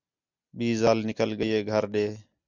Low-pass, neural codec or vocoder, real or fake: 7.2 kHz; none; real